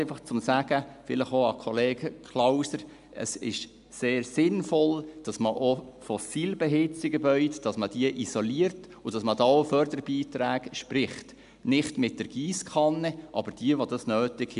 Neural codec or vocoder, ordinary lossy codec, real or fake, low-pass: none; AAC, 96 kbps; real; 10.8 kHz